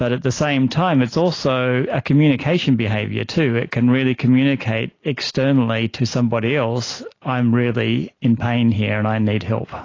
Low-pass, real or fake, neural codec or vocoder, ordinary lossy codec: 7.2 kHz; real; none; AAC, 32 kbps